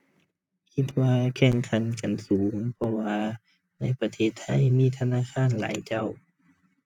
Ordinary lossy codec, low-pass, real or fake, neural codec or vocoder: none; 19.8 kHz; fake; vocoder, 44.1 kHz, 128 mel bands, Pupu-Vocoder